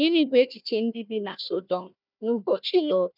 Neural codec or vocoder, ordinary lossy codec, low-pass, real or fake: codec, 16 kHz, 1 kbps, FunCodec, trained on Chinese and English, 50 frames a second; none; 5.4 kHz; fake